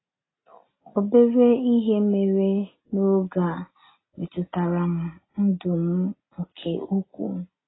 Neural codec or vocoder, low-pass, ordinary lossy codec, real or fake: none; 7.2 kHz; AAC, 16 kbps; real